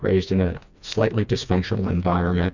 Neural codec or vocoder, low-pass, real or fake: codec, 16 kHz, 2 kbps, FreqCodec, smaller model; 7.2 kHz; fake